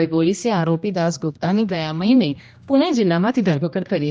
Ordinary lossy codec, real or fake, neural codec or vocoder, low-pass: none; fake; codec, 16 kHz, 1 kbps, X-Codec, HuBERT features, trained on general audio; none